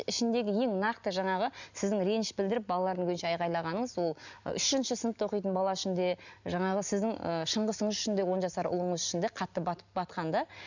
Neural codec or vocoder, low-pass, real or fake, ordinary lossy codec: none; 7.2 kHz; real; none